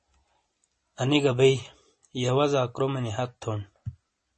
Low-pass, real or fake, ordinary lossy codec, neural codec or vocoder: 10.8 kHz; real; MP3, 32 kbps; none